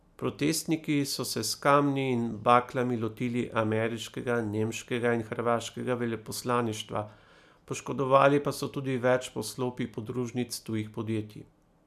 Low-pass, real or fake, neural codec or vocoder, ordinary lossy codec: 14.4 kHz; real; none; MP3, 96 kbps